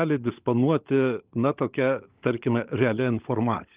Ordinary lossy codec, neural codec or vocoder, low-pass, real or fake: Opus, 32 kbps; codec, 16 kHz, 16 kbps, FunCodec, trained on LibriTTS, 50 frames a second; 3.6 kHz; fake